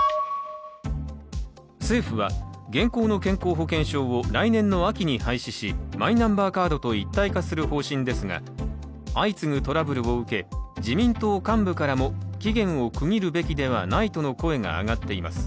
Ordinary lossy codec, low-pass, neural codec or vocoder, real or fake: none; none; none; real